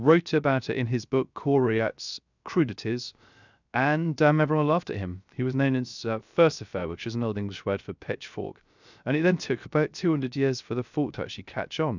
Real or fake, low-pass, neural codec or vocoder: fake; 7.2 kHz; codec, 16 kHz, 0.3 kbps, FocalCodec